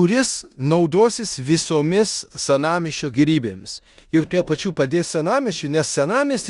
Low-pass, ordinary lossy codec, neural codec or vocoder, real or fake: 10.8 kHz; Opus, 64 kbps; codec, 16 kHz in and 24 kHz out, 0.9 kbps, LongCat-Audio-Codec, four codebook decoder; fake